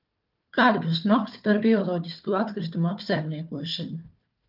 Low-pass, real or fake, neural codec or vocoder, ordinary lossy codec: 5.4 kHz; fake; codec, 16 kHz, 4 kbps, FunCodec, trained on Chinese and English, 50 frames a second; Opus, 24 kbps